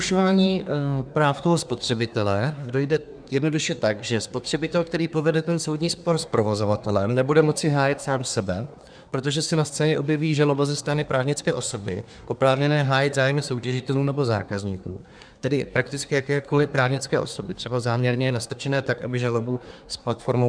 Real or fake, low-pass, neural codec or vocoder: fake; 9.9 kHz; codec, 24 kHz, 1 kbps, SNAC